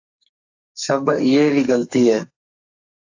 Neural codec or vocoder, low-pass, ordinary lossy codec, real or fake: codec, 44.1 kHz, 2.6 kbps, SNAC; 7.2 kHz; AAC, 48 kbps; fake